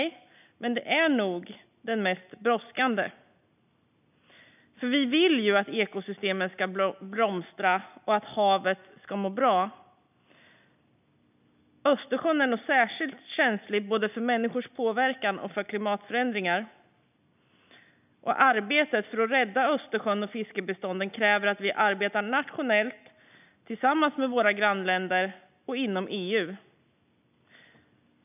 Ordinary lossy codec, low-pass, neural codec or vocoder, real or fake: none; 3.6 kHz; none; real